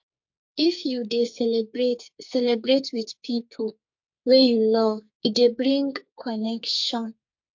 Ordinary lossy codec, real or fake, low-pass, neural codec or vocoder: MP3, 48 kbps; fake; 7.2 kHz; codec, 44.1 kHz, 2.6 kbps, SNAC